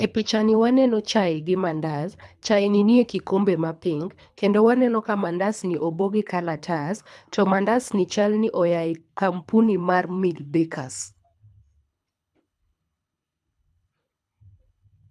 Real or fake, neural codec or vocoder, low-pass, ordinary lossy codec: fake; codec, 24 kHz, 3 kbps, HILCodec; none; none